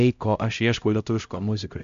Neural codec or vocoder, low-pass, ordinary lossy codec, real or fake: codec, 16 kHz, 0.5 kbps, X-Codec, HuBERT features, trained on LibriSpeech; 7.2 kHz; MP3, 64 kbps; fake